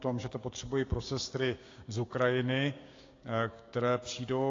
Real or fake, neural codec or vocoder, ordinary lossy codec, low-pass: fake; codec, 16 kHz, 6 kbps, DAC; AAC, 32 kbps; 7.2 kHz